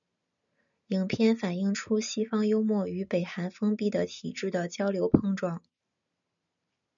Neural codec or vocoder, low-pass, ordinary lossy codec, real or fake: none; 7.2 kHz; MP3, 96 kbps; real